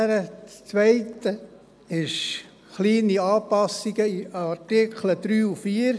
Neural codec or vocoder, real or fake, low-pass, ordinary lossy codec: none; real; none; none